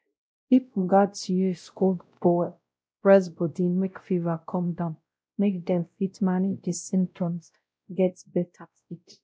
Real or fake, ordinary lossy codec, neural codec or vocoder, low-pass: fake; none; codec, 16 kHz, 0.5 kbps, X-Codec, WavLM features, trained on Multilingual LibriSpeech; none